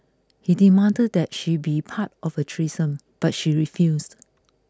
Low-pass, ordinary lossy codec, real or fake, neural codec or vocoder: none; none; real; none